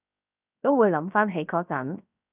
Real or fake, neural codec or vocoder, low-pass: fake; codec, 16 kHz, 0.7 kbps, FocalCodec; 3.6 kHz